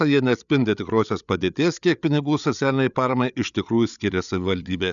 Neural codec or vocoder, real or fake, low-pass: codec, 16 kHz, 8 kbps, FreqCodec, larger model; fake; 7.2 kHz